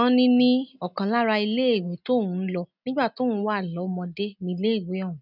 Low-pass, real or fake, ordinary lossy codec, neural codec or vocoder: 5.4 kHz; real; none; none